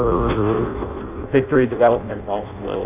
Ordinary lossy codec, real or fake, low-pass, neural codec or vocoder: AAC, 32 kbps; fake; 3.6 kHz; codec, 16 kHz in and 24 kHz out, 0.6 kbps, FireRedTTS-2 codec